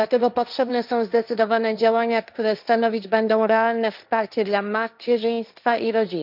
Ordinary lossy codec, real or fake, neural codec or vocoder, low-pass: none; fake; codec, 16 kHz, 1.1 kbps, Voila-Tokenizer; 5.4 kHz